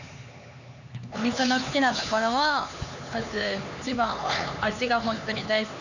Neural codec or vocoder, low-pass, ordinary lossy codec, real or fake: codec, 16 kHz, 4 kbps, X-Codec, HuBERT features, trained on LibriSpeech; 7.2 kHz; none; fake